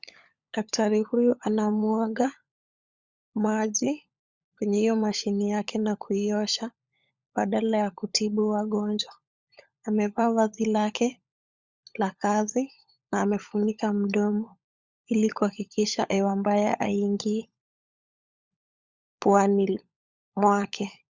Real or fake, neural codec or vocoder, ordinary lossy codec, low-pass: fake; codec, 16 kHz, 16 kbps, FunCodec, trained on LibriTTS, 50 frames a second; Opus, 64 kbps; 7.2 kHz